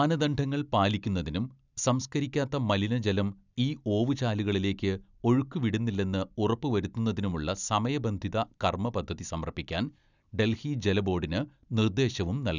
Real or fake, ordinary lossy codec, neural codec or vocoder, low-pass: real; none; none; 7.2 kHz